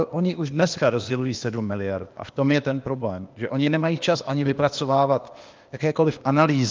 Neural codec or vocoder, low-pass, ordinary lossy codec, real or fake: codec, 16 kHz, 0.8 kbps, ZipCodec; 7.2 kHz; Opus, 24 kbps; fake